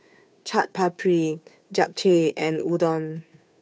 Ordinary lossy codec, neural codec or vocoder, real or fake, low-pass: none; codec, 16 kHz, 2 kbps, FunCodec, trained on Chinese and English, 25 frames a second; fake; none